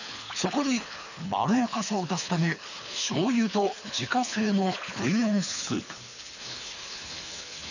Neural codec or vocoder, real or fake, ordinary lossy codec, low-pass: codec, 24 kHz, 3 kbps, HILCodec; fake; none; 7.2 kHz